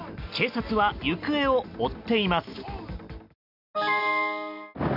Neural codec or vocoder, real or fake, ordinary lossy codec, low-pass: none; real; none; 5.4 kHz